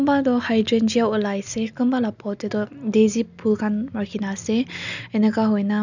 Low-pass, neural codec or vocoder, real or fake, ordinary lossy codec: 7.2 kHz; none; real; none